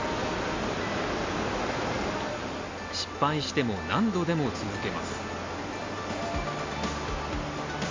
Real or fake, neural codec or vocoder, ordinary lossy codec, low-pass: real; none; MP3, 48 kbps; 7.2 kHz